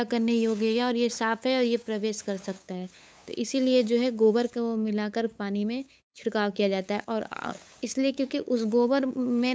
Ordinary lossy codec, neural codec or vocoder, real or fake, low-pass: none; codec, 16 kHz, 8 kbps, FunCodec, trained on LibriTTS, 25 frames a second; fake; none